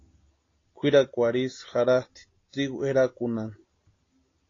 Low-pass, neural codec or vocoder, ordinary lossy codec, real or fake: 7.2 kHz; none; AAC, 32 kbps; real